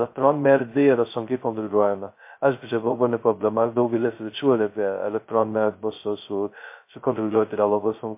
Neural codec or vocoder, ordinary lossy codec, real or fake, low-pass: codec, 16 kHz, 0.2 kbps, FocalCodec; MP3, 24 kbps; fake; 3.6 kHz